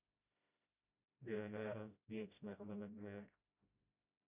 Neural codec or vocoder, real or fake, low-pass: codec, 16 kHz, 0.5 kbps, FreqCodec, smaller model; fake; 3.6 kHz